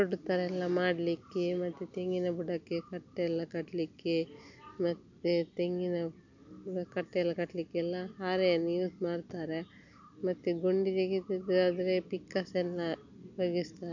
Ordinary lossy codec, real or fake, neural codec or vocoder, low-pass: none; real; none; 7.2 kHz